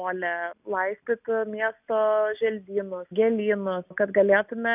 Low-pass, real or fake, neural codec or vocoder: 3.6 kHz; real; none